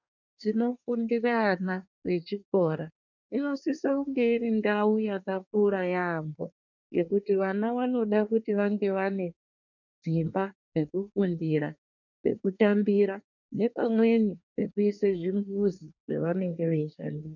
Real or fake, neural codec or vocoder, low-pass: fake; codec, 24 kHz, 1 kbps, SNAC; 7.2 kHz